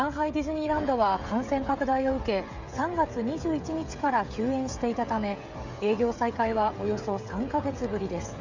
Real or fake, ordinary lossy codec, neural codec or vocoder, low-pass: fake; Opus, 64 kbps; codec, 16 kHz, 16 kbps, FreqCodec, smaller model; 7.2 kHz